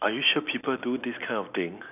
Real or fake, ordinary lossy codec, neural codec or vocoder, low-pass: real; none; none; 3.6 kHz